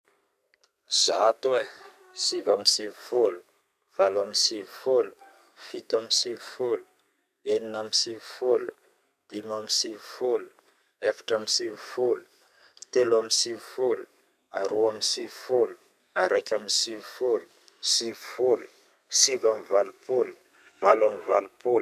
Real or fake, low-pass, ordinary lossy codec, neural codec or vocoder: fake; 14.4 kHz; none; codec, 32 kHz, 1.9 kbps, SNAC